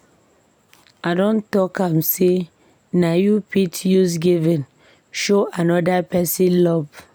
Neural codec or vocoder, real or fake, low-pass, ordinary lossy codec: none; real; none; none